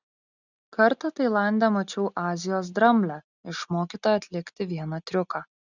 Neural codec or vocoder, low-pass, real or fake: none; 7.2 kHz; real